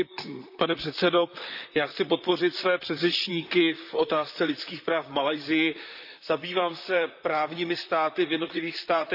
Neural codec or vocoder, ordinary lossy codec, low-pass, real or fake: vocoder, 44.1 kHz, 128 mel bands, Pupu-Vocoder; none; 5.4 kHz; fake